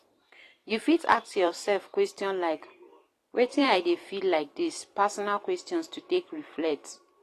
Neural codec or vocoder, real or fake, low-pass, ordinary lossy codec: vocoder, 44.1 kHz, 128 mel bands every 512 samples, BigVGAN v2; fake; 14.4 kHz; AAC, 48 kbps